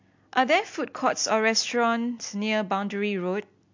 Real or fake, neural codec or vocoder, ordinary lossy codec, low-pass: real; none; MP3, 48 kbps; 7.2 kHz